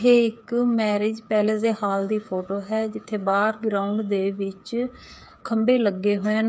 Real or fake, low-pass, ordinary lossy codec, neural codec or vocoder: fake; none; none; codec, 16 kHz, 8 kbps, FreqCodec, smaller model